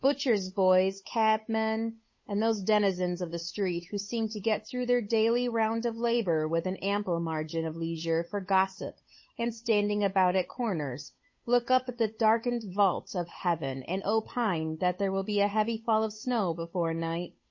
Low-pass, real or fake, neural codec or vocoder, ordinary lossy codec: 7.2 kHz; fake; codec, 16 kHz, 8 kbps, FunCodec, trained on Chinese and English, 25 frames a second; MP3, 32 kbps